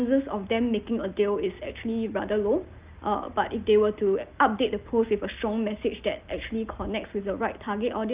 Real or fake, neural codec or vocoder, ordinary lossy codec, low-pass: real; none; Opus, 24 kbps; 3.6 kHz